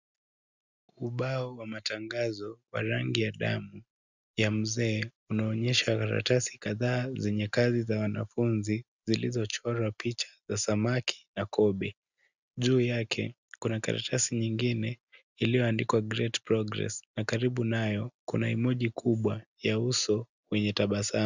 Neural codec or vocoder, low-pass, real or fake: none; 7.2 kHz; real